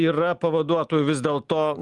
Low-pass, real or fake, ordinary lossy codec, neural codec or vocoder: 10.8 kHz; real; Opus, 24 kbps; none